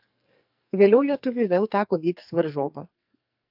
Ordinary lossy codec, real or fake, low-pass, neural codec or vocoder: AAC, 48 kbps; fake; 5.4 kHz; codec, 44.1 kHz, 2.6 kbps, SNAC